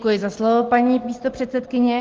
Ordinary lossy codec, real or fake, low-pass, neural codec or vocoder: Opus, 16 kbps; real; 7.2 kHz; none